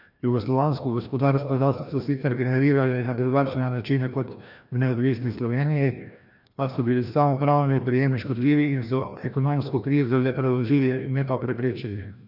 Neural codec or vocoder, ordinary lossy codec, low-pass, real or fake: codec, 16 kHz, 1 kbps, FreqCodec, larger model; none; 5.4 kHz; fake